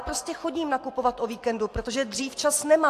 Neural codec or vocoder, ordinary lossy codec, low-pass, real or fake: none; AAC, 64 kbps; 14.4 kHz; real